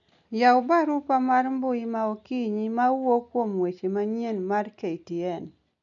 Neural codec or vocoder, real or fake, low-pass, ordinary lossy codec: none; real; 7.2 kHz; none